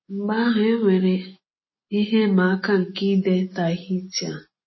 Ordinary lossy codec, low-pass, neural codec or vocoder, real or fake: MP3, 24 kbps; 7.2 kHz; none; real